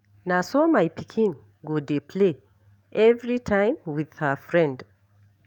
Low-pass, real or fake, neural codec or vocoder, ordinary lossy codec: 19.8 kHz; fake; codec, 44.1 kHz, 7.8 kbps, DAC; none